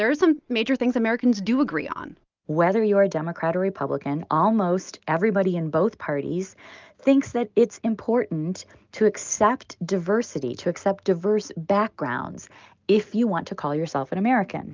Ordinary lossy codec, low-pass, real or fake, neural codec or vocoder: Opus, 24 kbps; 7.2 kHz; real; none